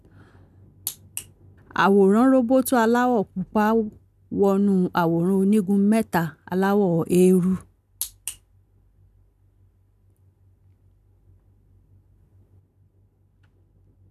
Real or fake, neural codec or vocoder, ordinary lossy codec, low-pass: real; none; none; 14.4 kHz